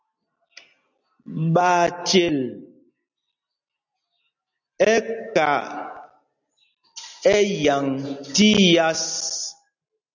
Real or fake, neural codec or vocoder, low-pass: real; none; 7.2 kHz